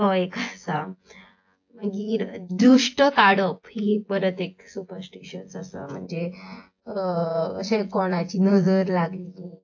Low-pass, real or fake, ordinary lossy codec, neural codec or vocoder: 7.2 kHz; fake; AAC, 48 kbps; vocoder, 24 kHz, 100 mel bands, Vocos